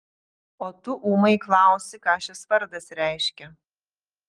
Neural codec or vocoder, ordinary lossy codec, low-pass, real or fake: none; Opus, 24 kbps; 10.8 kHz; real